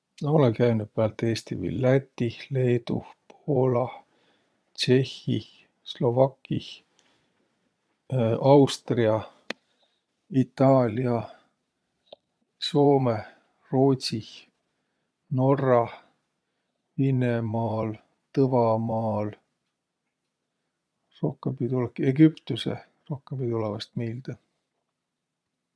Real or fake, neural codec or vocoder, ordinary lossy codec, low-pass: fake; vocoder, 22.05 kHz, 80 mel bands, Vocos; none; none